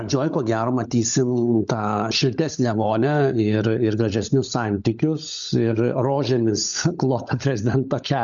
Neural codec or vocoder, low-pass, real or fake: codec, 16 kHz, 16 kbps, FunCodec, trained on LibriTTS, 50 frames a second; 7.2 kHz; fake